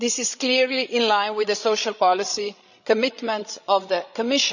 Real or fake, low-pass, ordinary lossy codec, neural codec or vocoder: fake; 7.2 kHz; none; codec, 16 kHz, 16 kbps, FreqCodec, larger model